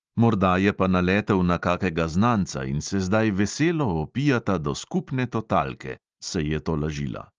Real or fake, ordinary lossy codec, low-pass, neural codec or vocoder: real; Opus, 24 kbps; 7.2 kHz; none